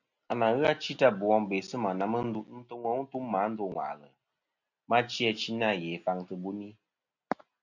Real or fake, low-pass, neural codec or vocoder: real; 7.2 kHz; none